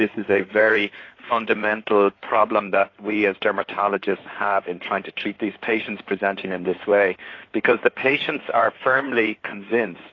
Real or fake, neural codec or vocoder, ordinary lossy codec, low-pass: fake; codec, 16 kHz in and 24 kHz out, 2.2 kbps, FireRedTTS-2 codec; AAC, 32 kbps; 7.2 kHz